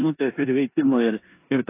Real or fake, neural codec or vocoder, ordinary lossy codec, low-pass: fake; codec, 16 kHz in and 24 kHz out, 0.9 kbps, LongCat-Audio-Codec, four codebook decoder; AAC, 24 kbps; 3.6 kHz